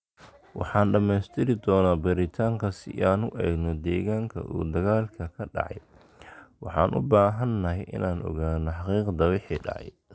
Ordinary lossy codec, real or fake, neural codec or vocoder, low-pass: none; real; none; none